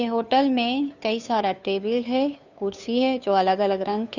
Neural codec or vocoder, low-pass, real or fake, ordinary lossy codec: codec, 16 kHz, 2 kbps, FunCodec, trained on Chinese and English, 25 frames a second; 7.2 kHz; fake; none